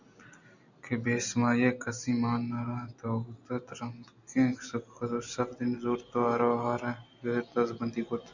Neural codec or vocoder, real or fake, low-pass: none; real; 7.2 kHz